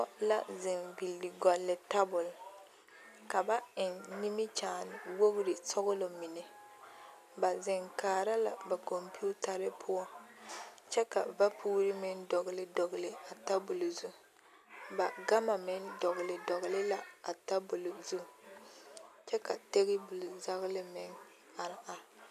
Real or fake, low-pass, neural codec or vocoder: real; 14.4 kHz; none